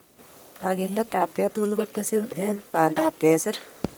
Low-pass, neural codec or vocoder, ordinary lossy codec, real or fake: none; codec, 44.1 kHz, 1.7 kbps, Pupu-Codec; none; fake